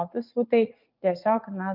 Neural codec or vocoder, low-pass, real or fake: vocoder, 44.1 kHz, 80 mel bands, Vocos; 5.4 kHz; fake